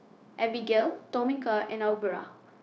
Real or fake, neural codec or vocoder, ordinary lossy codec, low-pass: fake; codec, 16 kHz, 0.9 kbps, LongCat-Audio-Codec; none; none